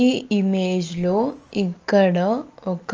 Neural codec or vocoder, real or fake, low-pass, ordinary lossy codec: none; real; 7.2 kHz; Opus, 24 kbps